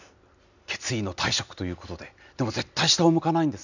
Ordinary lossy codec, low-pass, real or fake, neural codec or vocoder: none; 7.2 kHz; real; none